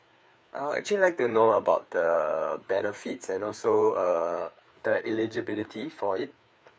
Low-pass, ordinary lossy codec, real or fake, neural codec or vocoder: none; none; fake; codec, 16 kHz, 4 kbps, FreqCodec, larger model